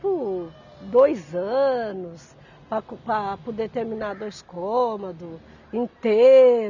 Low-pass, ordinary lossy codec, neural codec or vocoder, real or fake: 7.2 kHz; none; none; real